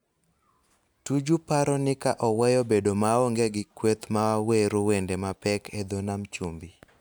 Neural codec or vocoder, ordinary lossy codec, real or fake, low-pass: none; none; real; none